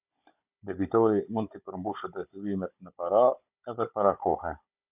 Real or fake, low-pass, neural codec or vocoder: fake; 3.6 kHz; codec, 16 kHz, 16 kbps, FunCodec, trained on Chinese and English, 50 frames a second